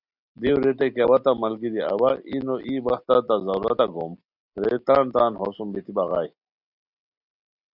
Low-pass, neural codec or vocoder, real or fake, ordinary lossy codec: 5.4 kHz; none; real; Opus, 64 kbps